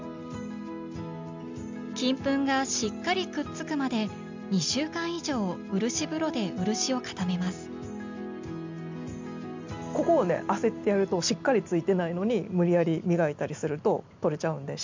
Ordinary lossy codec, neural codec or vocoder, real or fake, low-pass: MP3, 64 kbps; none; real; 7.2 kHz